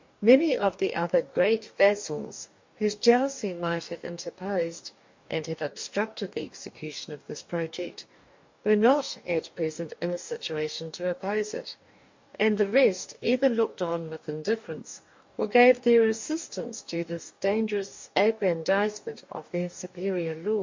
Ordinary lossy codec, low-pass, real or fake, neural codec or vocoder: MP3, 48 kbps; 7.2 kHz; fake; codec, 44.1 kHz, 2.6 kbps, DAC